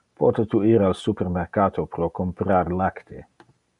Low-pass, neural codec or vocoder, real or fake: 10.8 kHz; none; real